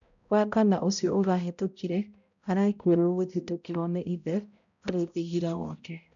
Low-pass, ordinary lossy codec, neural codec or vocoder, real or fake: 7.2 kHz; none; codec, 16 kHz, 0.5 kbps, X-Codec, HuBERT features, trained on balanced general audio; fake